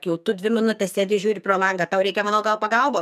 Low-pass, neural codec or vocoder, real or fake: 14.4 kHz; codec, 44.1 kHz, 2.6 kbps, SNAC; fake